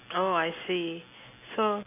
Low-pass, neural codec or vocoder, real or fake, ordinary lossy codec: 3.6 kHz; none; real; AAC, 32 kbps